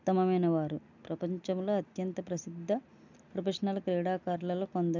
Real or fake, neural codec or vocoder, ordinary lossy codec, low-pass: real; none; none; 7.2 kHz